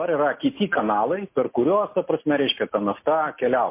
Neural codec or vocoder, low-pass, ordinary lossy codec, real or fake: none; 3.6 kHz; MP3, 24 kbps; real